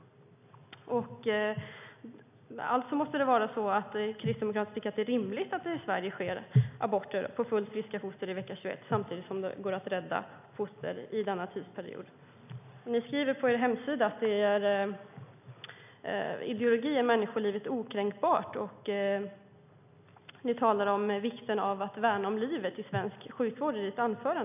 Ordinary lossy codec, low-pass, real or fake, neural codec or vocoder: none; 3.6 kHz; real; none